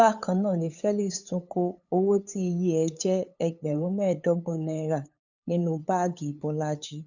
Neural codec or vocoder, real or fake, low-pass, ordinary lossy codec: codec, 16 kHz, 8 kbps, FunCodec, trained on LibriTTS, 25 frames a second; fake; 7.2 kHz; none